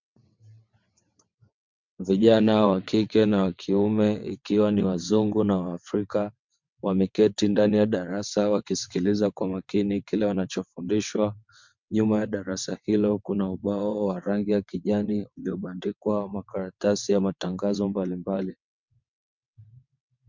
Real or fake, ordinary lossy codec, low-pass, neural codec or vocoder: fake; MP3, 64 kbps; 7.2 kHz; vocoder, 22.05 kHz, 80 mel bands, WaveNeXt